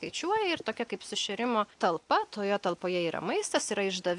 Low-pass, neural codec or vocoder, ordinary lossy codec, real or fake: 10.8 kHz; none; AAC, 64 kbps; real